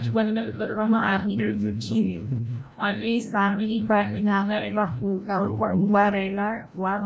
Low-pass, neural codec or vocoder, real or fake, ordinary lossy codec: none; codec, 16 kHz, 0.5 kbps, FreqCodec, larger model; fake; none